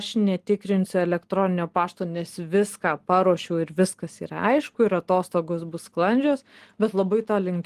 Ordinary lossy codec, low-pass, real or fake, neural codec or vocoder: Opus, 24 kbps; 14.4 kHz; real; none